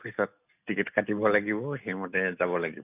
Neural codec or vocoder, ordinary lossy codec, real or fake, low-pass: none; none; real; 3.6 kHz